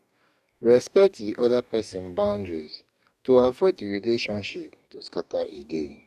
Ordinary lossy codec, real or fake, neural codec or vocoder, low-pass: none; fake; codec, 44.1 kHz, 2.6 kbps, DAC; 14.4 kHz